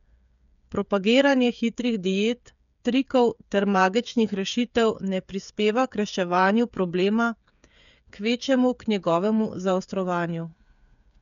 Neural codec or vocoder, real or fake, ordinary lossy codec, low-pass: codec, 16 kHz, 8 kbps, FreqCodec, smaller model; fake; none; 7.2 kHz